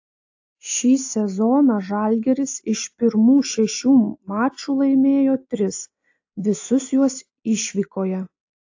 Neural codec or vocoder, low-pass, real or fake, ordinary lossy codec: none; 7.2 kHz; real; AAC, 48 kbps